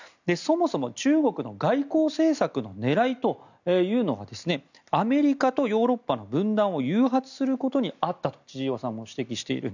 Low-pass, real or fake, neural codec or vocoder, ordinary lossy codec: 7.2 kHz; real; none; none